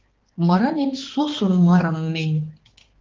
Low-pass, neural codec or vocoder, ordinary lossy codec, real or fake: 7.2 kHz; codec, 16 kHz, 2 kbps, X-Codec, HuBERT features, trained on balanced general audio; Opus, 16 kbps; fake